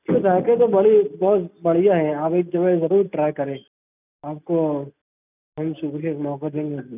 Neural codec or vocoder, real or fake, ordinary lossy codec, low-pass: none; real; none; 3.6 kHz